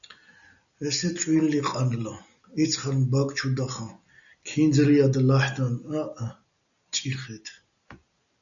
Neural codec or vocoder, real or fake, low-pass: none; real; 7.2 kHz